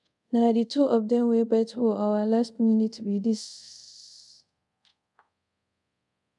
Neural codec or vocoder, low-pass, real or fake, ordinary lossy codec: codec, 24 kHz, 0.5 kbps, DualCodec; 10.8 kHz; fake; none